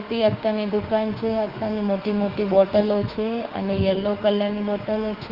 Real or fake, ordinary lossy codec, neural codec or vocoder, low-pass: fake; Opus, 32 kbps; autoencoder, 48 kHz, 32 numbers a frame, DAC-VAE, trained on Japanese speech; 5.4 kHz